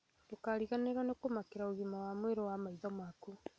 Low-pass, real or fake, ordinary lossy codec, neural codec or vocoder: none; real; none; none